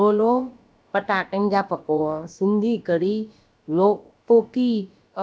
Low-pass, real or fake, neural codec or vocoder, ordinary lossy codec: none; fake; codec, 16 kHz, about 1 kbps, DyCAST, with the encoder's durations; none